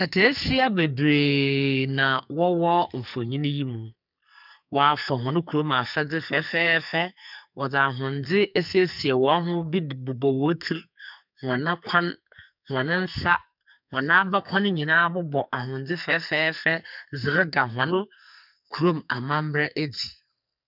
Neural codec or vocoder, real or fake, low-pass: codec, 44.1 kHz, 2.6 kbps, SNAC; fake; 5.4 kHz